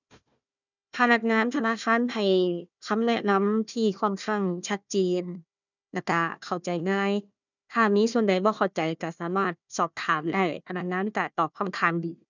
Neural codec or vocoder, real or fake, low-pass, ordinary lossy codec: codec, 16 kHz, 1 kbps, FunCodec, trained on Chinese and English, 50 frames a second; fake; 7.2 kHz; none